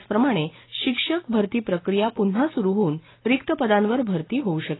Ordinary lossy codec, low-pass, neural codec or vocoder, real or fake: AAC, 16 kbps; 7.2 kHz; none; real